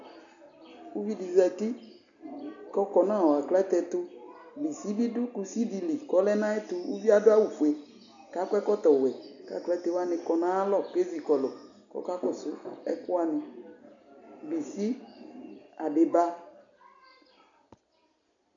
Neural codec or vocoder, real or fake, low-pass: none; real; 7.2 kHz